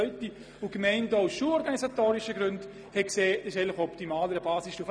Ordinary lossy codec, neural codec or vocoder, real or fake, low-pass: none; none; real; none